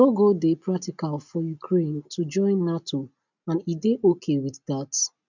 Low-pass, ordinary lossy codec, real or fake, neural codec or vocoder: 7.2 kHz; none; real; none